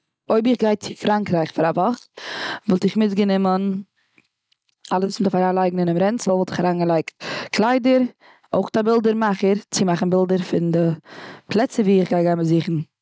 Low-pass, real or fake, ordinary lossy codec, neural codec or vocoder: none; real; none; none